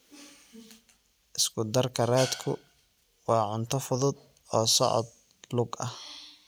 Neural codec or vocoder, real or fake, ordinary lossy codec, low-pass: none; real; none; none